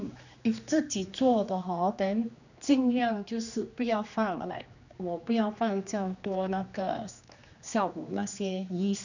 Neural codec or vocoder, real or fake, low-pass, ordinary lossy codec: codec, 16 kHz, 2 kbps, X-Codec, HuBERT features, trained on general audio; fake; 7.2 kHz; none